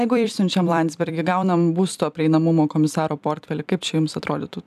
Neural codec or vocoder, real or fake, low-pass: vocoder, 44.1 kHz, 128 mel bands every 256 samples, BigVGAN v2; fake; 14.4 kHz